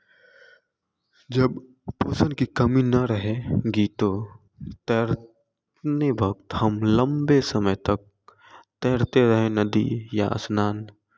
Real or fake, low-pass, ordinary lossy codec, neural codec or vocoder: real; none; none; none